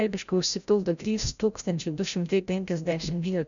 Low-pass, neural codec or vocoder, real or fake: 7.2 kHz; codec, 16 kHz, 0.5 kbps, FreqCodec, larger model; fake